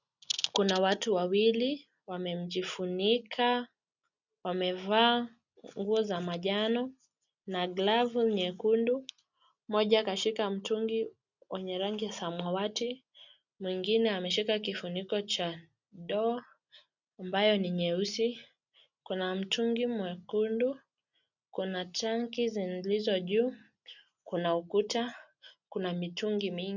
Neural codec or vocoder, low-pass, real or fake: none; 7.2 kHz; real